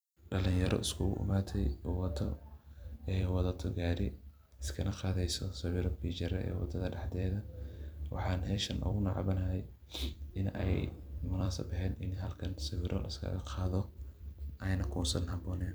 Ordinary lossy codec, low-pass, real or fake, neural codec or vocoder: none; none; fake; vocoder, 44.1 kHz, 128 mel bands every 256 samples, BigVGAN v2